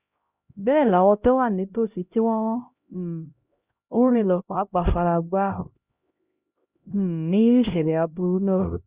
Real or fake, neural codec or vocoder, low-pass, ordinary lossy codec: fake; codec, 16 kHz, 0.5 kbps, X-Codec, HuBERT features, trained on LibriSpeech; 3.6 kHz; Opus, 64 kbps